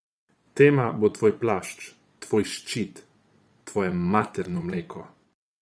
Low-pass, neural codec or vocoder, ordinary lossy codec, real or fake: none; vocoder, 22.05 kHz, 80 mel bands, Vocos; none; fake